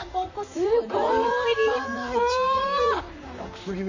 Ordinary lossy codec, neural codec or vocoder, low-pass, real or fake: none; vocoder, 44.1 kHz, 128 mel bands, Pupu-Vocoder; 7.2 kHz; fake